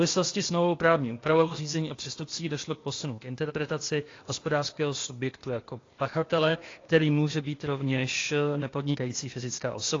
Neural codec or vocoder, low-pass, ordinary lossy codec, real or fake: codec, 16 kHz, 0.8 kbps, ZipCodec; 7.2 kHz; AAC, 32 kbps; fake